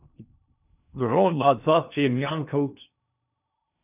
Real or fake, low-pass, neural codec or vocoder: fake; 3.6 kHz; codec, 16 kHz in and 24 kHz out, 0.6 kbps, FocalCodec, streaming, 2048 codes